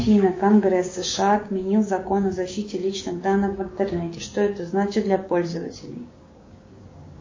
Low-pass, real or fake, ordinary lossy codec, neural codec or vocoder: 7.2 kHz; fake; MP3, 32 kbps; codec, 16 kHz, 6 kbps, DAC